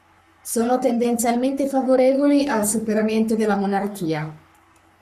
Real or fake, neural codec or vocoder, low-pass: fake; codec, 44.1 kHz, 3.4 kbps, Pupu-Codec; 14.4 kHz